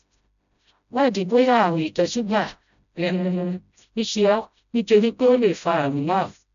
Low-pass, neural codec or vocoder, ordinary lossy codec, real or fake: 7.2 kHz; codec, 16 kHz, 0.5 kbps, FreqCodec, smaller model; none; fake